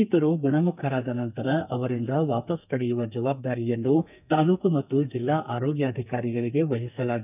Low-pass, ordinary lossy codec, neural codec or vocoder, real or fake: 3.6 kHz; none; codec, 32 kHz, 1.9 kbps, SNAC; fake